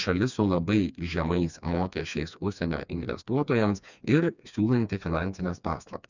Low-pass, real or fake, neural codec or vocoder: 7.2 kHz; fake; codec, 16 kHz, 2 kbps, FreqCodec, smaller model